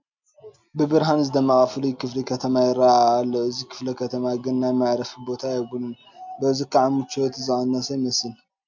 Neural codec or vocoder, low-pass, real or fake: none; 7.2 kHz; real